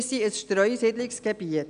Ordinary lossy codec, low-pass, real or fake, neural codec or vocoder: none; 9.9 kHz; real; none